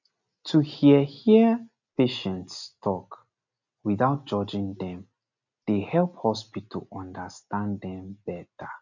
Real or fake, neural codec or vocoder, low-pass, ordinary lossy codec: real; none; 7.2 kHz; none